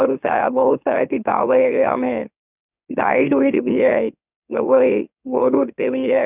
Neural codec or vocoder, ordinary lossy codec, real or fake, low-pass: autoencoder, 44.1 kHz, a latent of 192 numbers a frame, MeloTTS; none; fake; 3.6 kHz